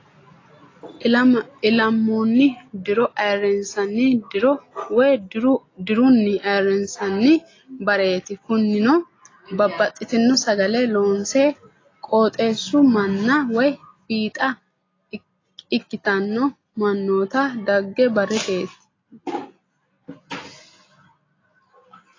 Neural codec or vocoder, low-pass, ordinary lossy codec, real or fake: none; 7.2 kHz; AAC, 32 kbps; real